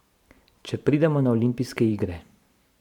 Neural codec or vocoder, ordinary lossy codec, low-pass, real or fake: vocoder, 44.1 kHz, 128 mel bands every 512 samples, BigVGAN v2; none; 19.8 kHz; fake